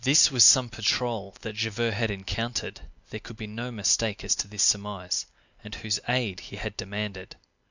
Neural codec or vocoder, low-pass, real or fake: none; 7.2 kHz; real